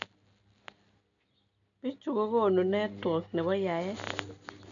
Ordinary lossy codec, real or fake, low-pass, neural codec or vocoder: none; real; 7.2 kHz; none